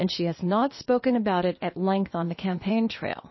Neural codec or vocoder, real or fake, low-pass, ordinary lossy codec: codec, 16 kHz, 0.8 kbps, ZipCodec; fake; 7.2 kHz; MP3, 24 kbps